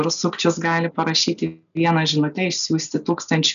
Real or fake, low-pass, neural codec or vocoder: real; 7.2 kHz; none